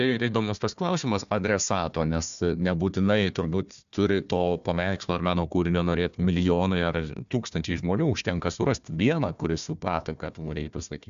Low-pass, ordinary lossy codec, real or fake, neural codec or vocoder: 7.2 kHz; AAC, 96 kbps; fake; codec, 16 kHz, 1 kbps, FunCodec, trained on Chinese and English, 50 frames a second